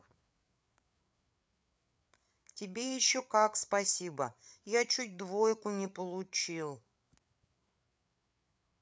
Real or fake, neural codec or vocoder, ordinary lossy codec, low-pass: fake; codec, 16 kHz, 8 kbps, FreqCodec, larger model; none; none